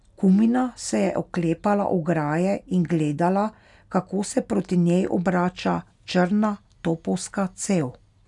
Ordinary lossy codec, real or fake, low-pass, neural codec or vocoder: none; real; 10.8 kHz; none